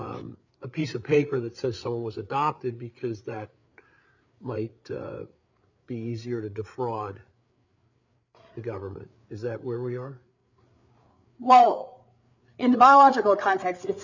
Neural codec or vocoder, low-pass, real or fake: codec, 16 kHz, 8 kbps, FreqCodec, larger model; 7.2 kHz; fake